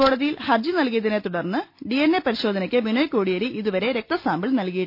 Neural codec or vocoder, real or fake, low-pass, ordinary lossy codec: none; real; 5.4 kHz; none